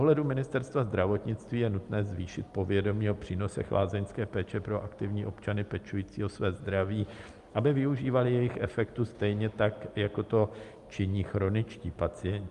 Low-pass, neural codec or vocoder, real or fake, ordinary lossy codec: 10.8 kHz; none; real; Opus, 32 kbps